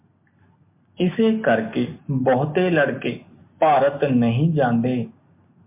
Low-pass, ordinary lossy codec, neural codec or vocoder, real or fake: 3.6 kHz; MP3, 32 kbps; none; real